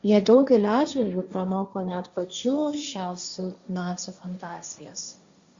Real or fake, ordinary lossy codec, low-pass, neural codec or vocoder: fake; Opus, 64 kbps; 7.2 kHz; codec, 16 kHz, 1.1 kbps, Voila-Tokenizer